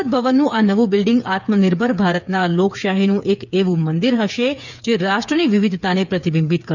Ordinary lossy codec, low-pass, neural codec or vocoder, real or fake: none; 7.2 kHz; codec, 16 kHz, 8 kbps, FreqCodec, smaller model; fake